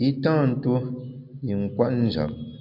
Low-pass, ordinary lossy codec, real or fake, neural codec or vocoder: 5.4 kHz; MP3, 48 kbps; real; none